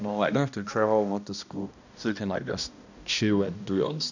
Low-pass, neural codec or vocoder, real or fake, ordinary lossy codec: 7.2 kHz; codec, 16 kHz, 1 kbps, X-Codec, HuBERT features, trained on balanced general audio; fake; none